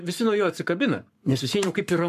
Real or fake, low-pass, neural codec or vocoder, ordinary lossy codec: fake; 14.4 kHz; codec, 44.1 kHz, 7.8 kbps, Pupu-Codec; MP3, 96 kbps